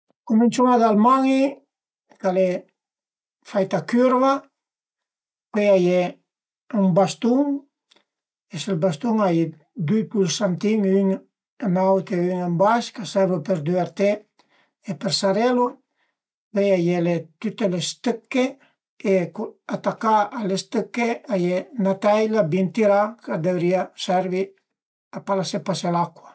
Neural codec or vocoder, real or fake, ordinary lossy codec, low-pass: none; real; none; none